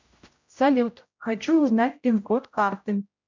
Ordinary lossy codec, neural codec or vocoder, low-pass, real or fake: MP3, 64 kbps; codec, 16 kHz, 0.5 kbps, X-Codec, HuBERT features, trained on general audio; 7.2 kHz; fake